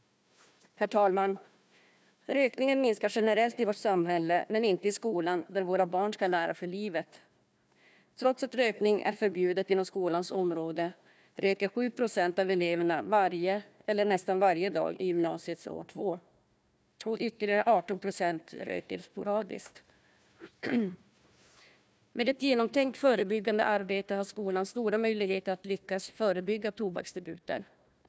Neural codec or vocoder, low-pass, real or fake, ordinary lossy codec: codec, 16 kHz, 1 kbps, FunCodec, trained on Chinese and English, 50 frames a second; none; fake; none